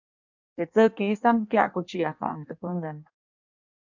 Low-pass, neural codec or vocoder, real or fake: 7.2 kHz; codec, 16 kHz in and 24 kHz out, 1.1 kbps, FireRedTTS-2 codec; fake